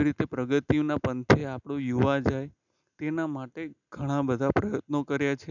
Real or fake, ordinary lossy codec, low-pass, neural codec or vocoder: real; none; 7.2 kHz; none